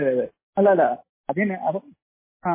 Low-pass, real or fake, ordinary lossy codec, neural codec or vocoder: 3.6 kHz; real; MP3, 16 kbps; none